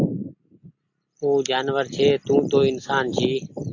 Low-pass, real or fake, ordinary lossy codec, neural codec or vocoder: 7.2 kHz; real; AAC, 48 kbps; none